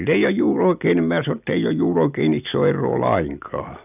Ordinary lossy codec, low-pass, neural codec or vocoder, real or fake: none; 3.6 kHz; none; real